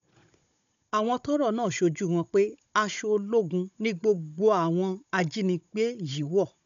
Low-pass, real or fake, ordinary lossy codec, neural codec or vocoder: 7.2 kHz; fake; MP3, 96 kbps; codec, 16 kHz, 16 kbps, FunCodec, trained on Chinese and English, 50 frames a second